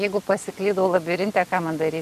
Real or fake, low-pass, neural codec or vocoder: real; 14.4 kHz; none